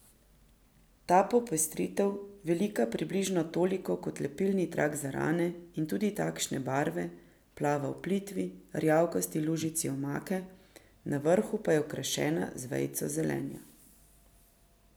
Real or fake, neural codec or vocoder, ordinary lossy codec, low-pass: real; none; none; none